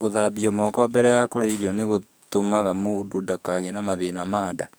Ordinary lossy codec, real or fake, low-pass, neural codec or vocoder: none; fake; none; codec, 44.1 kHz, 2.6 kbps, SNAC